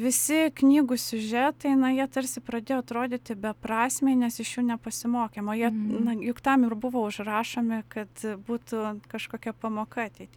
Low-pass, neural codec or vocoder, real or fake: 19.8 kHz; none; real